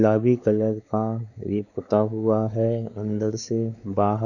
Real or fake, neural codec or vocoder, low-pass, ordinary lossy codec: fake; codec, 16 kHz, 4 kbps, X-Codec, WavLM features, trained on Multilingual LibriSpeech; 7.2 kHz; none